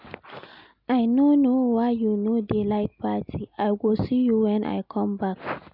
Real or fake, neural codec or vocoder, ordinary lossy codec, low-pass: real; none; none; 5.4 kHz